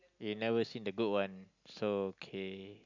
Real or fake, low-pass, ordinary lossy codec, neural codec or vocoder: real; 7.2 kHz; none; none